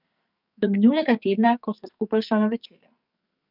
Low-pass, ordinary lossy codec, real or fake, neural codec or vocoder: 5.4 kHz; none; fake; codec, 44.1 kHz, 2.6 kbps, SNAC